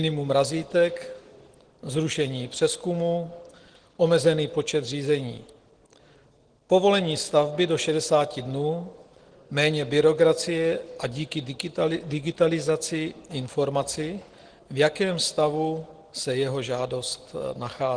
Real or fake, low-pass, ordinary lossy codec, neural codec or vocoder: real; 9.9 kHz; Opus, 16 kbps; none